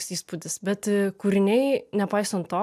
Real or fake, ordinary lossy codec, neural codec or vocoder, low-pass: real; AAC, 96 kbps; none; 14.4 kHz